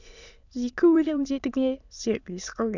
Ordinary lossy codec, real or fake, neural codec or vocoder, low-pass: none; fake; autoencoder, 22.05 kHz, a latent of 192 numbers a frame, VITS, trained on many speakers; 7.2 kHz